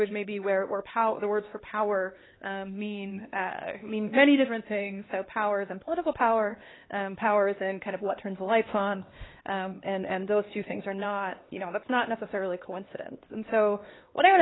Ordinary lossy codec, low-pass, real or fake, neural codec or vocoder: AAC, 16 kbps; 7.2 kHz; fake; codec, 16 kHz, 2 kbps, X-Codec, HuBERT features, trained on LibriSpeech